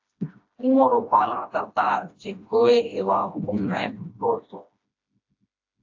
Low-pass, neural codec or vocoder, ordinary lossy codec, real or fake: 7.2 kHz; codec, 16 kHz, 1 kbps, FreqCodec, smaller model; AAC, 48 kbps; fake